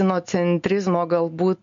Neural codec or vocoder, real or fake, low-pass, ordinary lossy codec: none; real; 7.2 kHz; MP3, 48 kbps